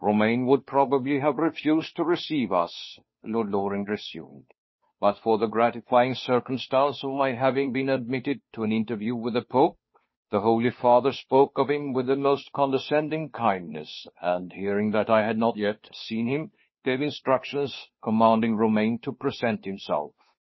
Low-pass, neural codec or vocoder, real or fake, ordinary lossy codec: 7.2 kHz; codec, 16 kHz, 2 kbps, FunCodec, trained on Chinese and English, 25 frames a second; fake; MP3, 24 kbps